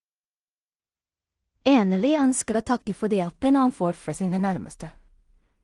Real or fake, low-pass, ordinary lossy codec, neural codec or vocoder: fake; 10.8 kHz; Opus, 24 kbps; codec, 16 kHz in and 24 kHz out, 0.4 kbps, LongCat-Audio-Codec, two codebook decoder